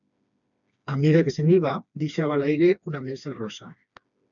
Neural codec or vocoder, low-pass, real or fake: codec, 16 kHz, 2 kbps, FreqCodec, smaller model; 7.2 kHz; fake